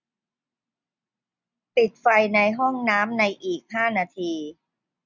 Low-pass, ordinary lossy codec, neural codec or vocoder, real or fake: 7.2 kHz; none; none; real